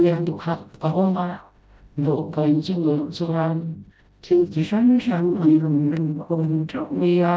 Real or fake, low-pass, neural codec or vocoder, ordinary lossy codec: fake; none; codec, 16 kHz, 0.5 kbps, FreqCodec, smaller model; none